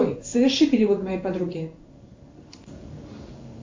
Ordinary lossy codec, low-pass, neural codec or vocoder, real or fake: MP3, 48 kbps; 7.2 kHz; codec, 16 kHz in and 24 kHz out, 1 kbps, XY-Tokenizer; fake